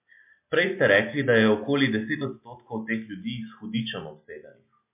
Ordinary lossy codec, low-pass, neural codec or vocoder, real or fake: MP3, 32 kbps; 3.6 kHz; none; real